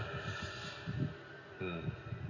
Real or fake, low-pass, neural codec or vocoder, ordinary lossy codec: real; 7.2 kHz; none; none